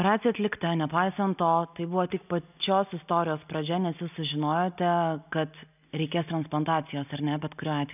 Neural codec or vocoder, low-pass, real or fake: none; 3.6 kHz; real